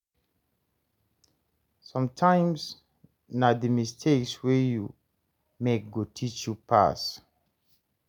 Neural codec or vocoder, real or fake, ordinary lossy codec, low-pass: none; real; none; 19.8 kHz